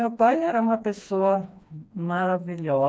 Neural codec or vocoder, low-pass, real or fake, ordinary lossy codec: codec, 16 kHz, 2 kbps, FreqCodec, smaller model; none; fake; none